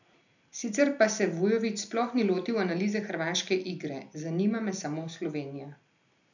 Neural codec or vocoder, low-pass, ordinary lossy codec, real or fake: none; 7.2 kHz; none; real